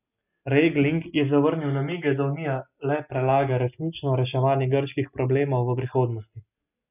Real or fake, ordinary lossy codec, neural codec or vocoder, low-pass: real; none; none; 3.6 kHz